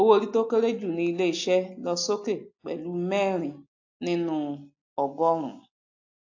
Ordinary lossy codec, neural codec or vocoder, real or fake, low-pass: AAC, 48 kbps; none; real; 7.2 kHz